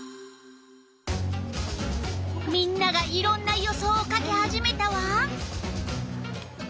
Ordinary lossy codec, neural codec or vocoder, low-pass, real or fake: none; none; none; real